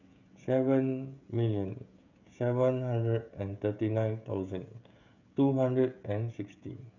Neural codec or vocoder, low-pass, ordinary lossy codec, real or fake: codec, 16 kHz, 16 kbps, FreqCodec, smaller model; 7.2 kHz; none; fake